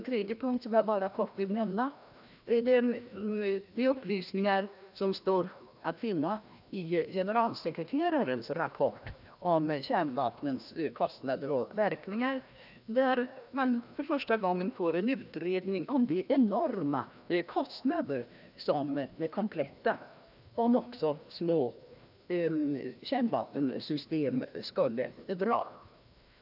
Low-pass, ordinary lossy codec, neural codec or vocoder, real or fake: 5.4 kHz; none; codec, 16 kHz, 1 kbps, FreqCodec, larger model; fake